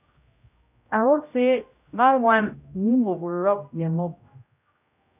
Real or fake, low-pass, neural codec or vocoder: fake; 3.6 kHz; codec, 16 kHz, 0.5 kbps, X-Codec, HuBERT features, trained on general audio